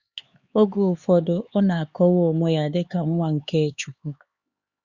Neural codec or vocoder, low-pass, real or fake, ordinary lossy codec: codec, 16 kHz, 4 kbps, X-Codec, HuBERT features, trained on LibriSpeech; 7.2 kHz; fake; Opus, 64 kbps